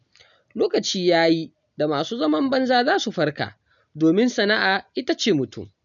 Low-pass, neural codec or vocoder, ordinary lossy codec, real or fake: 7.2 kHz; none; none; real